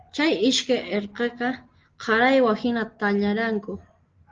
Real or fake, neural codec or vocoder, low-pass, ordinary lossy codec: real; none; 7.2 kHz; Opus, 16 kbps